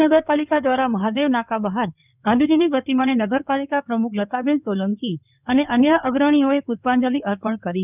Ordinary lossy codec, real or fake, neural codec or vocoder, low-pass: none; fake; codec, 16 kHz in and 24 kHz out, 2.2 kbps, FireRedTTS-2 codec; 3.6 kHz